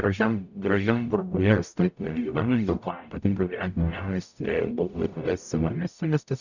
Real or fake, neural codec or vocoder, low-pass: fake; codec, 44.1 kHz, 0.9 kbps, DAC; 7.2 kHz